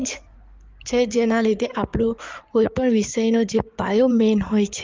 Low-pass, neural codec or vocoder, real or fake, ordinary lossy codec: 7.2 kHz; codec, 16 kHz in and 24 kHz out, 2.2 kbps, FireRedTTS-2 codec; fake; Opus, 24 kbps